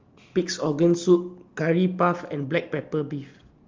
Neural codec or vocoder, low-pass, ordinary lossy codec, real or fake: none; 7.2 kHz; Opus, 32 kbps; real